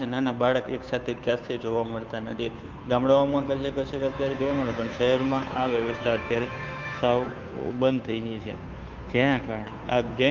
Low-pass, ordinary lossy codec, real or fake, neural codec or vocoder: 7.2 kHz; Opus, 24 kbps; fake; codec, 16 kHz, 2 kbps, FunCodec, trained on Chinese and English, 25 frames a second